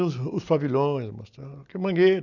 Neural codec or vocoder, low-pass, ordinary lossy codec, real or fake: none; 7.2 kHz; none; real